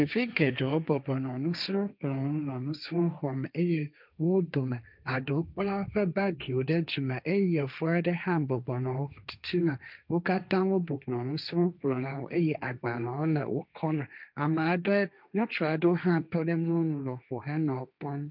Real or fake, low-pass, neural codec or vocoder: fake; 5.4 kHz; codec, 16 kHz, 1.1 kbps, Voila-Tokenizer